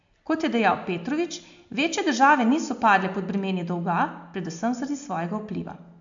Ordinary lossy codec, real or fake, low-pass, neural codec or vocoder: AAC, 64 kbps; real; 7.2 kHz; none